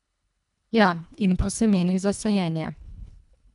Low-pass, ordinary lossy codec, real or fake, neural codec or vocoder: 10.8 kHz; none; fake; codec, 24 kHz, 1.5 kbps, HILCodec